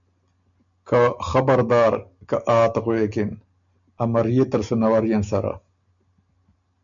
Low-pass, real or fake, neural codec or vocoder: 7.2 kHz; real; none